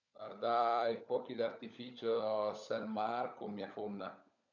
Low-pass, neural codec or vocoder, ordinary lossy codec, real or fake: 7.2 kHz; codec, 16 kHz, 16 kbps, FunCodec, trained on LibriTTS, 50 frames a second; none; fake